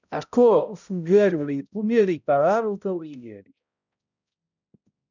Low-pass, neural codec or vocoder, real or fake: 7.2 kHz; codec, 16 kHz, 0.5 kbps, X-Codec, HuBERT features, trained on balanced general audio; fake